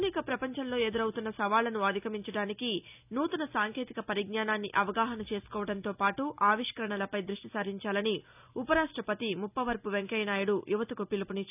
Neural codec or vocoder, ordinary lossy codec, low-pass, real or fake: none; none; 3.6 kHz; real